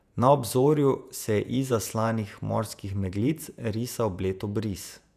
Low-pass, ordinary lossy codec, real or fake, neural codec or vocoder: 14.4 kHz; none; fake; vocoder, 48 kHz, 128 mel bands, Vocos